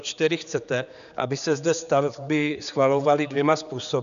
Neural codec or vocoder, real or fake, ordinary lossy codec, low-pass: codec, 16 kHz, 4 kbps, X-Codec, HuBERT features, trained on general audio; fake; MP3, 96 kbps; 7.2 kHz